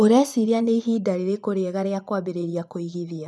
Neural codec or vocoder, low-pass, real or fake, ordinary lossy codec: vocoder, 24 kHz, 100 mel bands, Vocos; none; fake; none